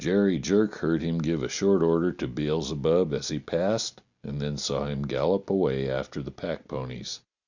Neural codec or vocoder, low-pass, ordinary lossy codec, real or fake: none; 7.2 kHz; Opus, 64 kbps; real